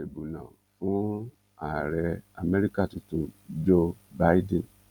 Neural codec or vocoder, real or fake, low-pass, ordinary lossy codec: vocoder, 44.1 kHz, 128 mel bands every 256 samples, BigVGAN v2; fake; 19.8 kHz; none